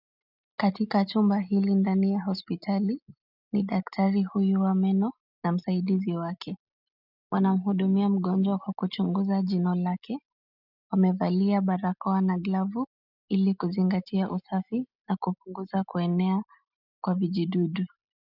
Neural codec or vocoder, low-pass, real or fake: none; 5.4 kHz; real